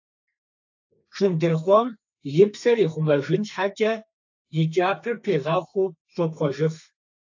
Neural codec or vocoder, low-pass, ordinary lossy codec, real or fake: codec, 32 kHz, 1.9 kbps, SNAC; 7.2 kHz; AAC, 48 kbps; fake